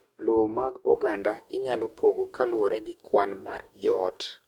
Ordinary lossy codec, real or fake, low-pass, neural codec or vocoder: Opus, 64 kbps; fake; 19.8 kHz; codec, 44.1 kHz, 2.6 kbps, DAC